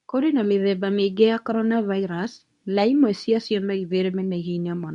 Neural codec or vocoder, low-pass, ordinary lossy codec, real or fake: codec, 24 kHz, 0.9 kbps, WavTokenizer, medium speech release version 2; 10.8 kHz; none; fake